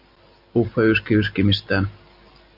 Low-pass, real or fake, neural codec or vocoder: 5.4 kHz; real; none